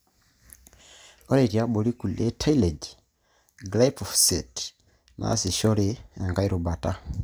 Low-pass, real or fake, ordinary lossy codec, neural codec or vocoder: none; real; none; none